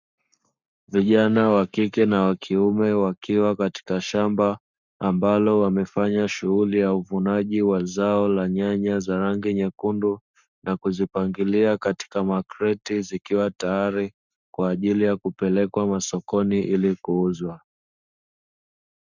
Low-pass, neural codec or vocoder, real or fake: 7.2 kHz; codec, 44.1 kHz, 7.8 kbps, Pupu-Codec; fake